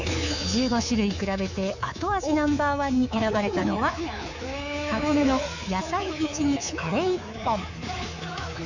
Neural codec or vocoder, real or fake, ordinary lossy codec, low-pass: codec, 24 kHz, 3.1 kbps, DualCodec; fake; none; 7.2 kHz